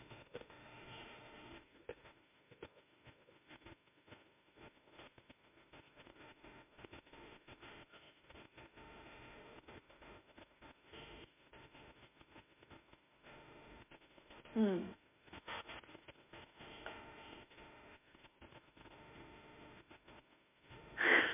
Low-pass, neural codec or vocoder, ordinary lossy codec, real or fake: 3.6 kHz; autoencoder, 48 kHz, 32 numbers a frame, DAC-VAE, trained on Japanese speech; MP3, 32 kbps; fake